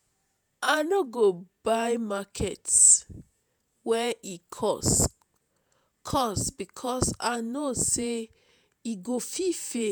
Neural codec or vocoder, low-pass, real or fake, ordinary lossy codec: vocoder, 48 kHz, 128 mel bands, Vocos; none; fake; none